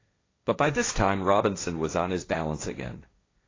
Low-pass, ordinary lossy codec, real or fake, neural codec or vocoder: 7.2 kHz; AAC, 32 kbps; fake; codec, 16 kHz, 1.1 kbps, Voila-Tokenizer